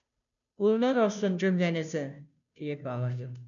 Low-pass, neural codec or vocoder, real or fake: 7.2 kHz; codec, 16 kHz, 0.5 kbps, FunCodec, trained on Chinese and English, 25 frames a second; fake